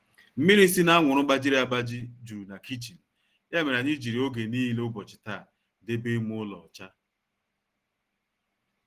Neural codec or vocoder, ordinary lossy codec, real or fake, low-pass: none; Opus, 16 kbps; real; 14.4 kHz